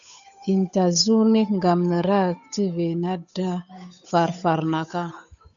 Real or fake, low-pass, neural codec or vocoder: fake; 7.2 kHz; codec, 16 kHz, 8 kbps, FunCodec, trained on Chinese and English, 25 frames a second